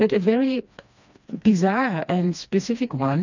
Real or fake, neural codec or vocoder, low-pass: fake; codec, 16 kHz, 2 kbps, FreqCodec, smaller model; 7.2 kHz